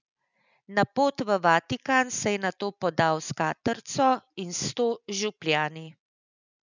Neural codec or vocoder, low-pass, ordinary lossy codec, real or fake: none; 7.2 kHz; none; real